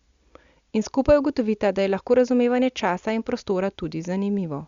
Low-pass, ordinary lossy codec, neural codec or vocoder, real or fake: 7.2 kHz; Opus, 64 kbps; none; real